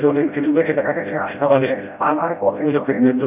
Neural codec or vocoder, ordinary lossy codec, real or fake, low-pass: codec, 16 kHz, 0.5 kbps, FreqCodec, smaller model; none; fake; 3.6 kHz